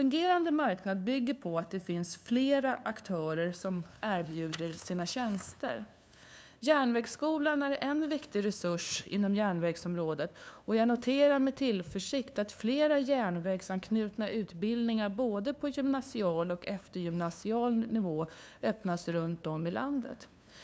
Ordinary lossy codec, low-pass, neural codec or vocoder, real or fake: none; none; codec, 16 kHz, 2 kbps, FunCodec, trained on LibriTTS, 25 frames a second; fake